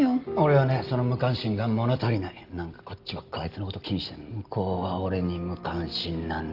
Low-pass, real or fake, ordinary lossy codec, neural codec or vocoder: 5.4 kHz; real; Opus, 32 kbps; none